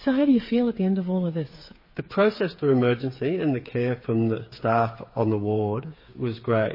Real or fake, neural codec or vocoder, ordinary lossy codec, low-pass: fake; codec, 16 kHz, 8 kbps, FreqCodec, smaller model; MP3, 24 kbps; 5.4 kHz